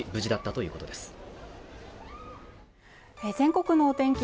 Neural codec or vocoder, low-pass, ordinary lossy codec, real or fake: none; none; none; real